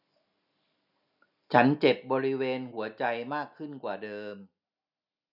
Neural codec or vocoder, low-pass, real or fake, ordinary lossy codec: none; 5.4 kHz; real; none